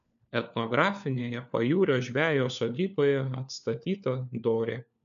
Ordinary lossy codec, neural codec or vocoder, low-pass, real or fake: AAC, 96 kbps; codec, 16 kHz, 4 kbps, FunCodec, trained on LibriTTS, 50 frames a second; 7.2 kHz; fake